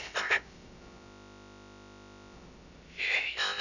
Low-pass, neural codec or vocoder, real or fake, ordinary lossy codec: 7.2 kHz; codec, 16 kHz, about 1 kbps, DyCAST, with the encoder's durations; fake; none